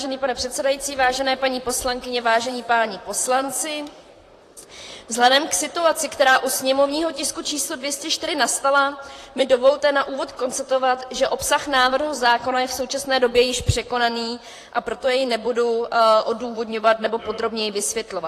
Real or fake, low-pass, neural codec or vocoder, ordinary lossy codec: fake; 14.4 kHz; vocoder, 44.1 kHz, 128 mel bands, Pupu-Vocoder; AAC, 48 kbps